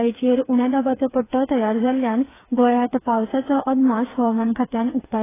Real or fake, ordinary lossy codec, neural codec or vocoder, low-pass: fake; AAC, 16 kbps; codec, 16 kHz, 4 kbps, FreqCodec, smaller model; 3.6 kHz